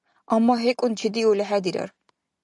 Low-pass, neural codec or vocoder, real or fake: 10.8 kHz; none; real